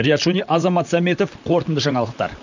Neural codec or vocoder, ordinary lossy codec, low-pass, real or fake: vocoder, 44.1 kHz, 128 mel bands, Pupu-Vocoder; none; 7.2 kHz; fake